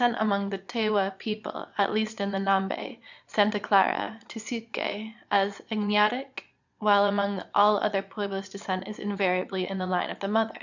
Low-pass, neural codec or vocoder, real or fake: 7.2 kHz; vocoder, 22.05 kHz, 80 mel bands, Vocos; fake